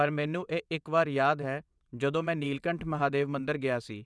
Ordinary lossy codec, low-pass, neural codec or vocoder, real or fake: none; none; vocoder, 22.05 kHz, 80 mel bands, WaveNeXt; fake